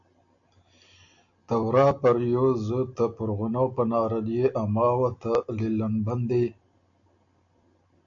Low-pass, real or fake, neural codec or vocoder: 7.2 kHz; real; none